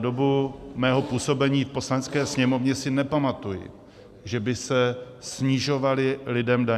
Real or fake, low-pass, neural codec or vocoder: real; 14.4 kHz; none